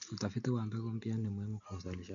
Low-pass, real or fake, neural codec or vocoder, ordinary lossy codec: 7.2 kHz; real; none; none